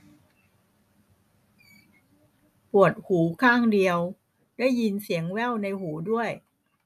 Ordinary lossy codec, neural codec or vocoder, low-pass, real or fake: none; none; 14.4 kHz; real